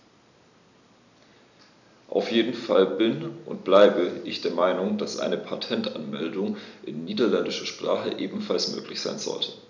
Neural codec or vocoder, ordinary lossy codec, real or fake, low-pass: none; none; real; 7.2 kHz